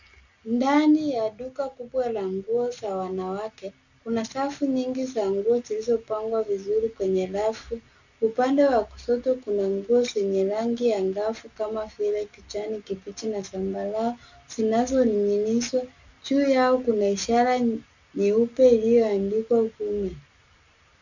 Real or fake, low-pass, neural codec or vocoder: real; 7.2 kHz; none